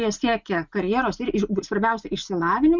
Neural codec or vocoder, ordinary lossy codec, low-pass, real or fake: none; Opus, 64 kbps; 7.2 kHz; real